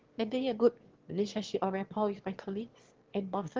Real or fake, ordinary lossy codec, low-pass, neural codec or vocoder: fake; Opus, 16 kbps; 7.2 kHz; autoencoder, 22.05 kHz, a latent of 192 numbers a frame, VITS, trained on one speaker